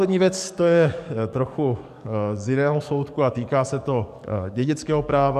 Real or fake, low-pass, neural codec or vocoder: fake; 14.4 kHz; codec, 44.1 kHz, 7.8 kbps, DAC